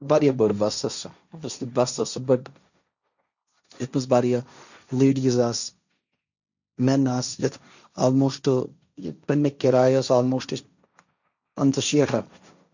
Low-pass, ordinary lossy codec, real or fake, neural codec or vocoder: 7.2 kHz; none; fake; codec, 16 kHz, 1.1 kbps, Voila-Tokenizer